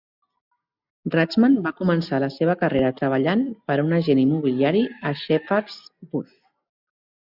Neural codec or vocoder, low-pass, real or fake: none; 5.4 kHz; real